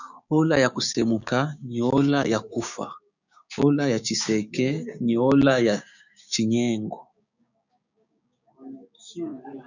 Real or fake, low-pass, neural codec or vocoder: fake; 7.2 kHz; codec, 16 kHz, 6 kbps, DAC